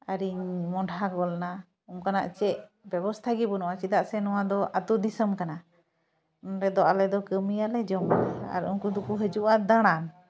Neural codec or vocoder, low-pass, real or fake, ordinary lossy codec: none; none; real; none